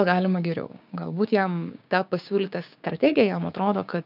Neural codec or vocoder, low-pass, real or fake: codec, 16 kHz, 6 kbps, DAC; 5.4 kHz; fake